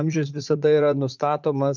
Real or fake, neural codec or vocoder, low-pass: fake; vocoder, 44.1 kHz, 80 mel bands, Vocos; 7.2 kHz